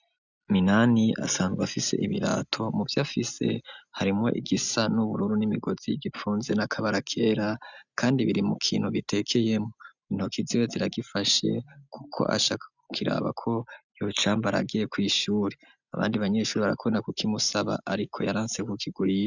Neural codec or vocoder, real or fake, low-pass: none; real; 7.2 kHz